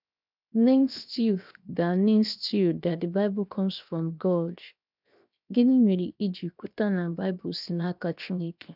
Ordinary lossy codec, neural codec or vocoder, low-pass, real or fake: none; codec, 16 kHz, 0.7 kbps, FocalCodec; 5.4 kHz; fake